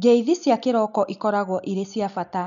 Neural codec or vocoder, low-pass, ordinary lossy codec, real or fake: none; 7.2 kHz; none; real